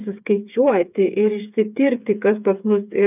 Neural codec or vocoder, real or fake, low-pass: codec, 16 kHz, 8 kbps, FreqCodec, smaller model; fake; 3.6 kHz